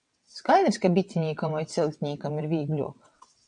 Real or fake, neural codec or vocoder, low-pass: fake; vocoder, 22.05 kHz, 80 mel bands, WaveNeXt; 9.9 kHz